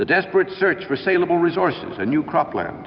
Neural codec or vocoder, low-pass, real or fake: vocoder, 44.1 kHz, 128 mel bands every 256 samples, BigVGAN v2; 7.2 kHz; fake